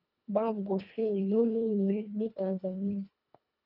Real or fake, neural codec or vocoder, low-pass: fake; codec, 24 kHz, 1.5 kbps, HILCodec; 5.4 kHz